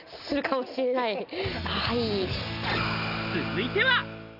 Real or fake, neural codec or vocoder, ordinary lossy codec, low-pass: real; none; none; 5.4 kHz